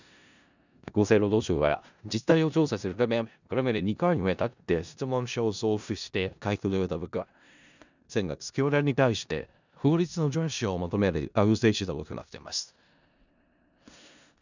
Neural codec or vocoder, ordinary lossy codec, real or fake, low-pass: codec, 16 kHz in and 24 kHz out, 0.4 kbps, LongCat-Audio-Codec, four codebook decoder; none; fake; 7.2 kHz